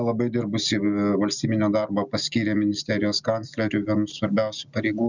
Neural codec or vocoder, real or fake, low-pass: none; real; 7.2 kHz